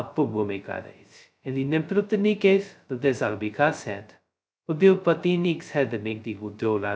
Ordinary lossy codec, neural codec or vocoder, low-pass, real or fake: none; codec, 16 kHz, 0.2 kbps, FocalCodec; none; fake